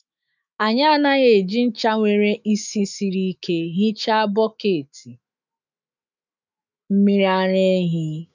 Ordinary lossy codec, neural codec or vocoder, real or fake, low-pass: none; autoencoder, 48 kHz, 128 numbers a frame, DAC-VAE, trained on Japanese speech; fake; 7.2 kHz